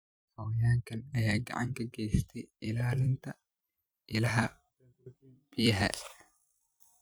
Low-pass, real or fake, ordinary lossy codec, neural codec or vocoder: none; fake; none; vocoder, 44.1 kHz, 128 mel bands every 256 samples, BigVGAN v2